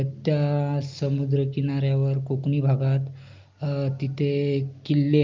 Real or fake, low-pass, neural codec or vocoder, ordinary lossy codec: real; 7.2 kHz; none; Opus, 24 kbps